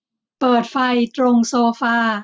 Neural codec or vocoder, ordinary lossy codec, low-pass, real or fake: none; none; none; real